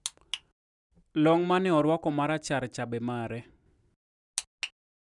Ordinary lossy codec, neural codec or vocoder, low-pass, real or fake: none; none; 10.8 kHz; real